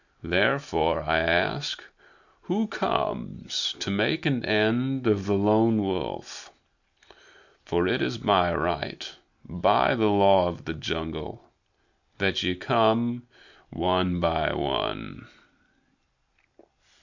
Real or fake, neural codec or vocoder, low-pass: real; none; 7.2 kHz